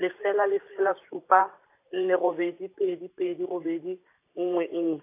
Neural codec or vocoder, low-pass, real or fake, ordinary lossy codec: vocoder, 44.1 kHz, 128 mel bands every 512 samples, BigVGAN v2; 3.6 kHz; fake; AAC, 24 kbps